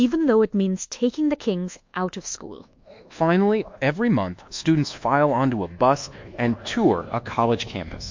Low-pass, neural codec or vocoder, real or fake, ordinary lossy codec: 7.2 kHz; codec, 24 kHz, 1.2 kbps, DualCodec; fake; MP3, 48 kbps